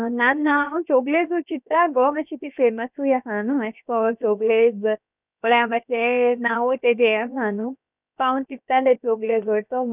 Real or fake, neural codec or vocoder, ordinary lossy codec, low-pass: fake; codec, 16 kHz, 0.7 kbps, FocalCodec; none; 3.6 kHz